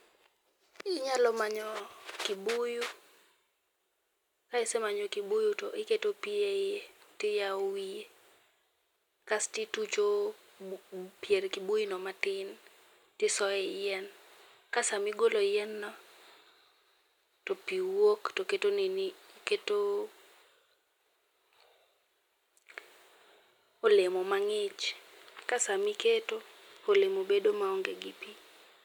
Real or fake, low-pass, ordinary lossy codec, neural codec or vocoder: real; 19.8 kHz; none; none